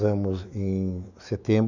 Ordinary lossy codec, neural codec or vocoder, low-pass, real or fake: none; autoencoder, 48 kHz, 128 numbers a frame, DAC-VAE, trained on Japanese speech; 7.2 kHz; fake